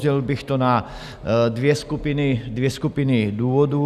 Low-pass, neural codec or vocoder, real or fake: 14.4 kHz; none; real